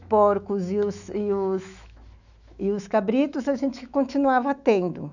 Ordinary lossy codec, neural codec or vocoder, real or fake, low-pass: none; autoencoder, 48 kHz, 128 numbers a frame, DAC-VAE, trained on Japanese speech; fake; 7.2 kHz